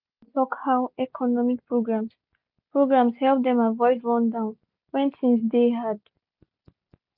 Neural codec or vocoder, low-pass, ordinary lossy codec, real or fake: none; 5.4 kHz; none; real